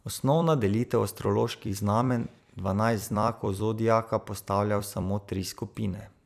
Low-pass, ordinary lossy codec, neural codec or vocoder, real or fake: 14.4 kHz; none; vocoder, 44.1 kHz, 128 mel bands every 256 samples, BigVGAN v2; fake